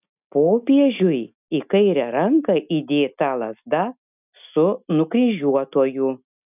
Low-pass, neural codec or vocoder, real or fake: 3.6 kHz; none; real